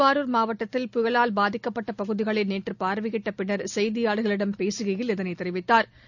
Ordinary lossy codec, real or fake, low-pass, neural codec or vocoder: none; real; 7.2 kHz; none